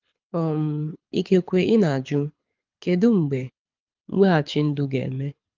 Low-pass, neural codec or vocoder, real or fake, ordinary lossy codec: 7.2 kHz; codec, 24 kHz, 6 kbps, HILCodec; fake; Opus, 24 kbps